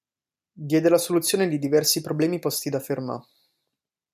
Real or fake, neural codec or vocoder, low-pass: real; none; 14.4 kHz